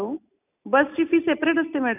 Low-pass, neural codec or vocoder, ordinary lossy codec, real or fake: 3.6 kHz; none; none; real